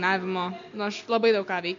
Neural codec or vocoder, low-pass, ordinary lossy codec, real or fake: none; 7.2 kHz; MP3, 48 kbps; real